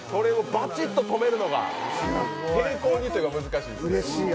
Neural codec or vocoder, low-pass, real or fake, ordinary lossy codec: none; none; real; none